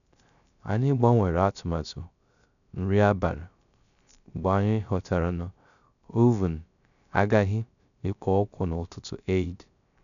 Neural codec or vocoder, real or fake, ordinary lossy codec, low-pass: codec, 16 kHz, 0.3 kbps, FocalCodec; fake; none; 7.2 kHz